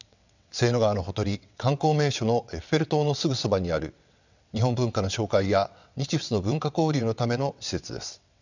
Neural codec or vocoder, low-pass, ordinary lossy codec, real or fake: vocoder, 22.05 kHz, 80 mel bands, WaveNeXt; 7.2 kHz; none; fake